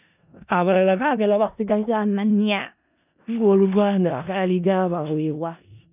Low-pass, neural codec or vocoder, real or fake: 3.6 kHz; codec, 16 kHz in and 24 kHz out, 0.4 kbps, LongCat-Audio-Codec, four codebook decoder; fake